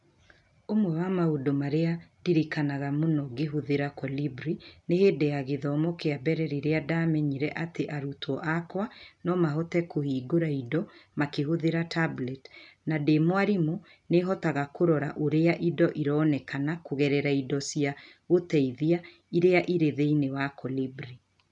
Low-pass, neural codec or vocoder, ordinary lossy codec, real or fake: 10.8 kHz; none; none; real